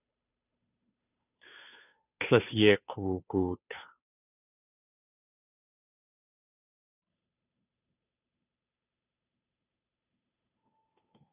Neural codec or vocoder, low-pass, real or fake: codec, 16 kHz, 2 kbps, FunCodec, trained on Chinese and English, 25 frames a second; 3.6 kHz; fake